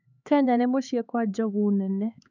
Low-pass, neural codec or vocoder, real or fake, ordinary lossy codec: 7.2 kHz; codec, 16 kHz, 4 kbps, X-Codec, HuBERT features, trained on LibriSpeech; fake; none